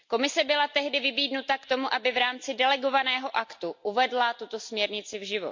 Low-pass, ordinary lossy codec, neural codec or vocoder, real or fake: 7.2 kHz; MP3, 48 kbps; none; real